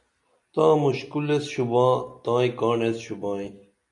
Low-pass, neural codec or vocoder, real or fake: 10.8 kHz; none; real